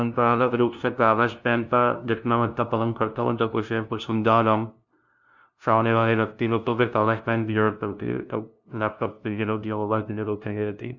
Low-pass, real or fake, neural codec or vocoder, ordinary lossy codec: 7.2 kHz; fake; codec, 16 kHz, 0.5 kbps, FunCodec, trained on LibriTTS, 25 frames a second; none